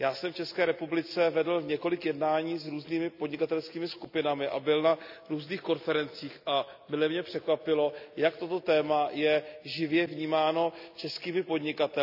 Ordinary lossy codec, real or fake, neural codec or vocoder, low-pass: none; real; none; 5.4 kHz